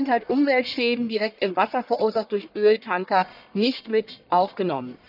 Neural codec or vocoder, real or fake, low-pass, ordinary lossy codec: codec, 44.1 kHz, 1.7 kbps, Pupu-Codec; fake; 5.4 kHz; none